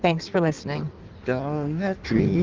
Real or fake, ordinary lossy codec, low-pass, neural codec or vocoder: fake; Opus, 32 kbps; 7.2 kHz; codec, 16 kHz in and 24 kHz out, 1.1 kbps, FireRedTTS-2 codec